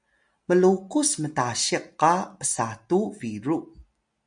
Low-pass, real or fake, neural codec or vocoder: 9.9 kHz; real; none